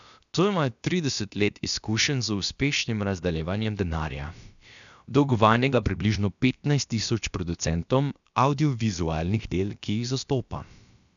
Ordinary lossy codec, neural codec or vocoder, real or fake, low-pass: none; codec, 16 kHz, about 1 kbps, DyCAST, with the encoder's durations; fake; 7.2 kHz